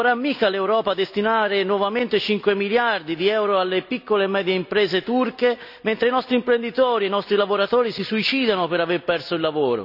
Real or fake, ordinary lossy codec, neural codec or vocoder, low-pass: real; none; none; 5.4 kHz